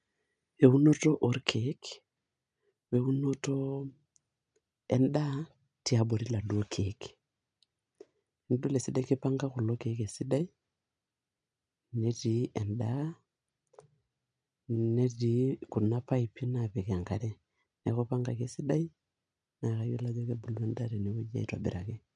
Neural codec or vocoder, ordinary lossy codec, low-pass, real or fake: none; none; 9.9 kHz; real